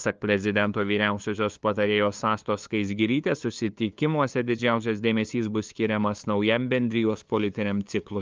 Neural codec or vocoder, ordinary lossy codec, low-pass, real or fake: codec, 16 kHz, 2 kbps, FunCodec, trained on LibriTTS, 25 frames a second; Opus, 24 kbps; 7.2 kHz; fake